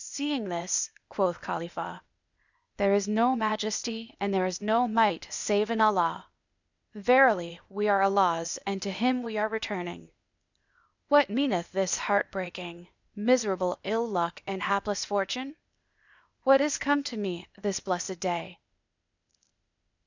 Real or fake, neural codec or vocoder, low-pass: fake; codec, 16 kHz, 0.8 kbps, ZipCodec; 7.2 kHz